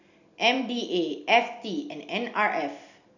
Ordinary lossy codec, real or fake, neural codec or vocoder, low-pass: none; real; none; 7.2 kHz